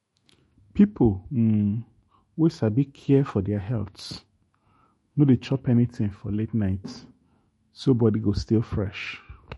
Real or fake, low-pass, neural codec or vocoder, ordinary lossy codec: fake; 19.8 kHz; autoencoder, 48 kHz, 128 numbers a frame, DAC-VAE, trained on Japanese speech; MP3, 48 kbps